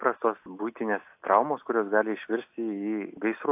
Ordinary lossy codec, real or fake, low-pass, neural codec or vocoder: AAC, 32 kbps; real; 3.6 kHz; none